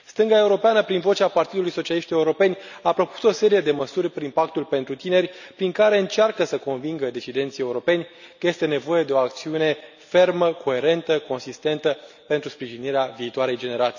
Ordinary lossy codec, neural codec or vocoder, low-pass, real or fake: none; none; 7.2 kHz; real